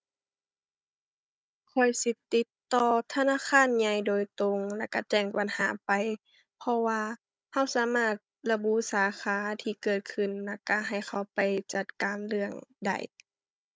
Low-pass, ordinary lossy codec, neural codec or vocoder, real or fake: none; none; codec, 16 kHz, 16 kbps, FunCodec, trained on Chinese and English, 50 frames a second; fake